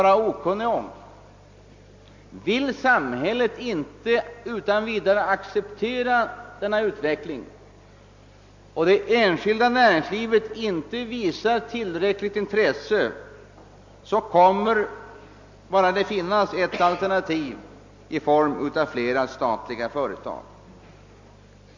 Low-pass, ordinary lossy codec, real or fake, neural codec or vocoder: 7.2 kHz; MP3, 48 kbps; real; none